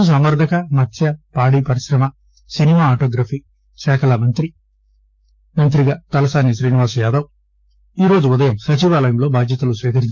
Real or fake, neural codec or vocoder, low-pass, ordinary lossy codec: fake; codec, 16 kHz, 6 kbps, DAC; none; none